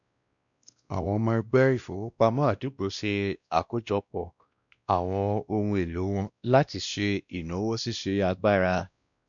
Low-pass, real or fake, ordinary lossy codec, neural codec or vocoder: 7.2 kHz; fake; none; codec, 16 kHz, 1 kbps, X-Codec, WavLM features, trained on Multilingual LibriSpeech